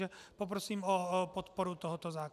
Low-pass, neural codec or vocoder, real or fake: 14.4 kHz; none; real